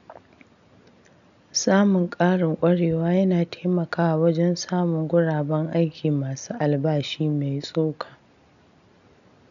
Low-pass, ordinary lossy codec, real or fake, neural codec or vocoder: 7.2 kHz; none; real; none